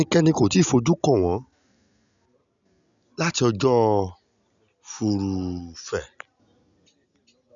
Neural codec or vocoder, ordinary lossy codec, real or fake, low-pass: none; none; real; 7.2 kHz